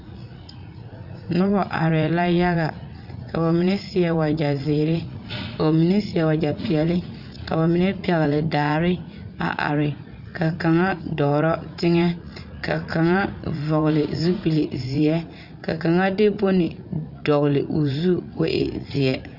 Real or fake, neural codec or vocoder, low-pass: fake; vocoder, 44.1 kHz, 80 mel bands, Vocos; 5.4 kHz